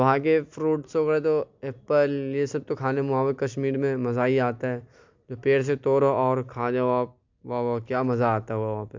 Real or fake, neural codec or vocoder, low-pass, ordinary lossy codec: real; none; 7.2 kHz; MP3, 64 kbps